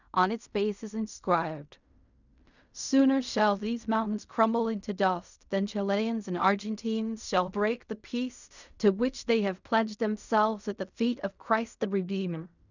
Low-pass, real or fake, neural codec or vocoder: 7.2 kHz; fake; codec, 16 kHz in and 24 kHz out, 0.4 kbps, LongCat-Audio-Codec, fine tuned four codebook decoder